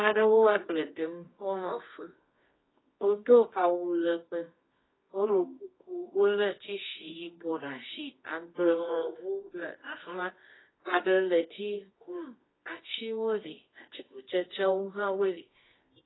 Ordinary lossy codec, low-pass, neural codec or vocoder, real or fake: AAC, 16 kbps; 7.2 kHz; codec, 24 kHz, 0.9 kbps, WavTokenizer, medium music audio release; fake